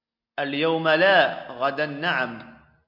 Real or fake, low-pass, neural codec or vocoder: real; 5.4 kHz; none